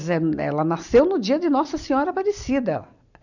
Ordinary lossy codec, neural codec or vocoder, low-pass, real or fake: none; none; 7.2 kHz; real